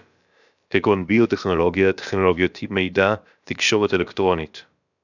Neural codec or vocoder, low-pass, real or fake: codec, 16 kHz, about 1 kbps, DyCAST, with the encoder's durations; 7.2 kHz; fake